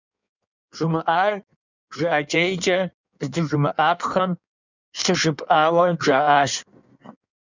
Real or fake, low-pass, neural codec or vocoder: fake; 7.2 kHz; codec, 16 kHz in and 24 kHz out, 1.1 kbps, FireRedTTS-2 codec